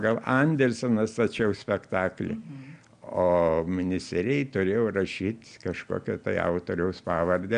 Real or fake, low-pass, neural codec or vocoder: real; 9.9 kHz; none